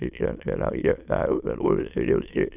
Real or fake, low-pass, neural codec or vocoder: fake; 3.6 kHz; autoencoder, 22.05 kHz, a latent of 192 numbers a frame, VITS, trained on many speakers